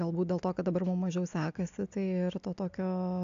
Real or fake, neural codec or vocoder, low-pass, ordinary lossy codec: real; none; 7.2 kHz; AAC, 64 kbps